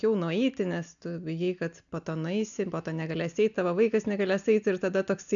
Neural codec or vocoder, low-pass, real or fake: none; 7.2 kHz; real